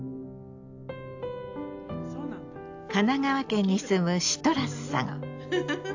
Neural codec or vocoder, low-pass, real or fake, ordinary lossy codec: none; 7.2 kHz; real; AAC, 48 kbps